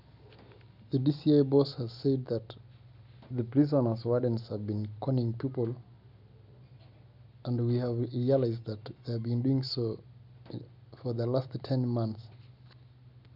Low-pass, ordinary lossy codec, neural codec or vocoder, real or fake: 5.4 kHz; none; none; real